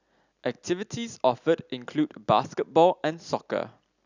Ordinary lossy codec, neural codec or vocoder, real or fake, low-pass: none; none; real; 7.2 kHz